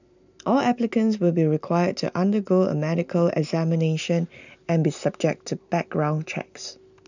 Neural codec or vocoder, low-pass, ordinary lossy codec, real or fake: none; 7.2 kHz; none; real